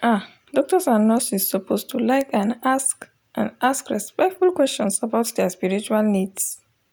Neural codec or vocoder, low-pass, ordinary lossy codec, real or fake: none; none; none; real